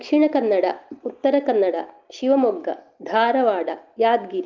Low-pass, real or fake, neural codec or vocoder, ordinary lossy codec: 7.2 kHz; real; none; Opus, 32 kbps